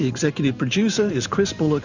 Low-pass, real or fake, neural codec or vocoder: 7.2 kHz; fake; vocoder, 44.1 kHz, 128 mel bands every 512 samples, BigVGAN v2